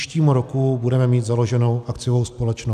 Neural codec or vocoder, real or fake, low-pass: none; real; 14.4 kHz